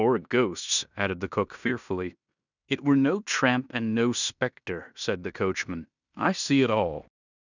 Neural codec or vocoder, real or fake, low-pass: codec, 16 kHz in and 24 kHz out, 0.9 kbps, LongCat-Audio-Codec, fine tuned four codebook decoder; fake; 7.2 kHz